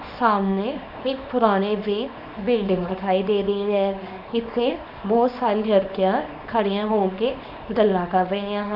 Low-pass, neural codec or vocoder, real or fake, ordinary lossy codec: 5.4 kHz; codec, 24 kHz, 0.9 kbps, WavTokenizer, small release; fake; AAC, 48 kbps